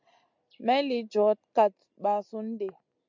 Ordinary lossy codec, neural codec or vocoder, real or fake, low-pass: MP3, 64 kbps; none; real; 7.2 kHz